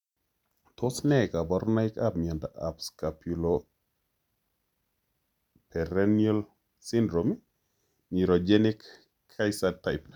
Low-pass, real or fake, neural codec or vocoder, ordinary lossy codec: 19.8 kHz; real; none; none